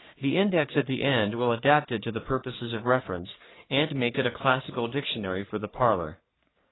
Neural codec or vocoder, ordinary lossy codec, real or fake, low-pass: codec, 44.1 kHz, 3.4 kbps, Pupu-Codec; AAC, 16 kbps; fake; 7.2 kHz